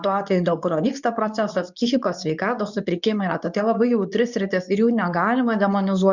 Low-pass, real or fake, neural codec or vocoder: 7.2 kHz; fake; codec, 24 kHz, 0.9 kbps, WavTokenizer, medium speech release version 2